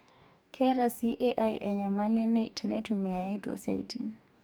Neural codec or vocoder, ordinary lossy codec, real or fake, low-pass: codec, 44.1 kHz, 2.6 kbps, DAC; none; fake; none